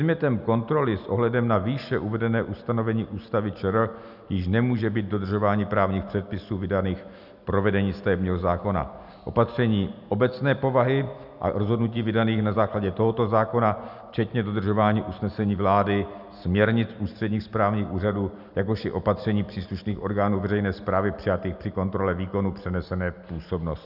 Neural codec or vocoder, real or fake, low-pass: none; real; 5.4 kHz